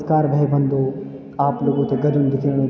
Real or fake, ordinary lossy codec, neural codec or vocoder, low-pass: real; none; none; none